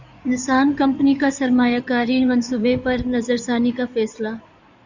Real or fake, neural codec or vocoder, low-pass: fake; codec, 16 kHz in and 24 kHz out, 2.2 kbps, FireRedTTS-2 codec; 7.2 kHz